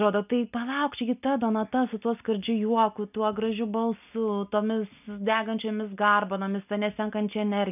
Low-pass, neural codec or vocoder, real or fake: 3.6 kHz; none; real